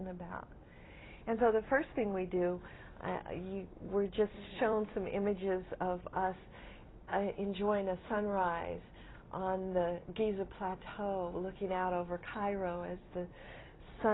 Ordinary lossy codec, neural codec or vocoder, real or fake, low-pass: AAC, 16 kbps; vocoder, 44.1 kHz, 128 mel bands every 256 samples, BigVGAN v2; fake; 7.2 kHz